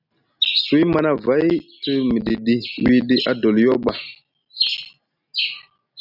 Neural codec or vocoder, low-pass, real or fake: none; 5.4 kHz; real